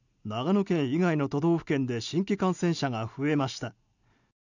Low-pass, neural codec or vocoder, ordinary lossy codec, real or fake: 7.2 kHz; none; none; real